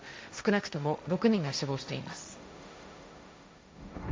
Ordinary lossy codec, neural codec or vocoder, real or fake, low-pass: none; codec, 16 kHz, 1.1 kbps, Voila-Tokenizer; fake; none